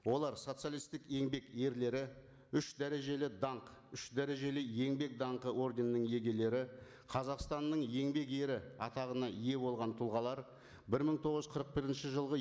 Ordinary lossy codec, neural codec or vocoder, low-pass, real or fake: none; none; none; real